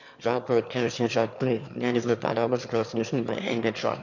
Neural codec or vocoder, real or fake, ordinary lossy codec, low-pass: autoencoder, 22.05 kHz, a latent of 192 numbers a frame, VITS, trained on one speaker; fake; none; 7.2 kHz